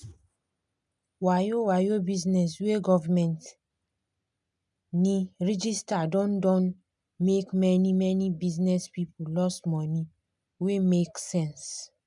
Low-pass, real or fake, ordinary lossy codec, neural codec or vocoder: 10.8 kHz; real; none; none